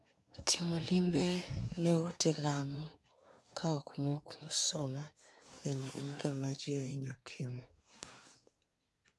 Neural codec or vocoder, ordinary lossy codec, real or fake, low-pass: codec, 24 kHz, 1 kbps, SNAC; none; fake; none